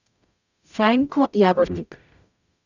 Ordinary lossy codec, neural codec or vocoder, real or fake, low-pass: none; codec, 44.1 kHz, 0.9 kbps, DAC; fake; 7.2 kHz